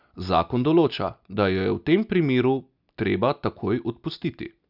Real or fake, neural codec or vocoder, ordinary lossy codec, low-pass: real; none; none; 5.4 kHz